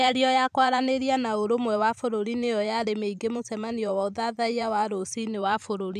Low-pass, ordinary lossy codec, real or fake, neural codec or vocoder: 14.4 kHz; none; fake; vocoder, 44.1 kHz, 128 mel bands every 512 samples, BigVGAN v2